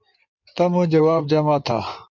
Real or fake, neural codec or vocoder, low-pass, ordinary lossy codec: fake; codec, 16 kHz in and 24 kHz out, 2.2 kbps, FireRedTTS-2 codec; 7.2 kHz; MP3, 64 kbps